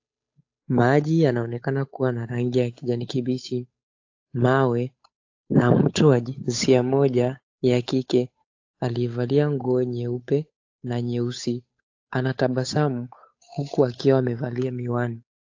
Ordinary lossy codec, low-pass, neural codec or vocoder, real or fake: AAC, 48 kbps; 7.2 kHz; codec, 16 kHz, 8 kbps, FunCodec, trained on Chinese and English, 25 frames a second; fake